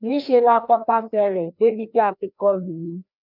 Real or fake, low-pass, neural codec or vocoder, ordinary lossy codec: fake; 5.4 kHz; codec, 16 kHz, 1 kbps, FreqCodec, larger model; none